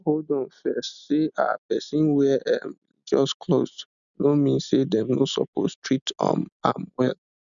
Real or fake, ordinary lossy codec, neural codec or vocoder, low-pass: real; none; none; 7.2 kHz